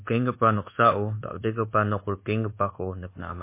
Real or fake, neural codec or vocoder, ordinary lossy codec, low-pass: fake; codec, 24 kHz, 1.2 kbps, DualCodec; MP3, 24 kbps; 3.6 kHz